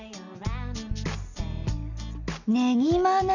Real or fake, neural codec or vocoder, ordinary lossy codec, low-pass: real; none; none; 7.2 kHz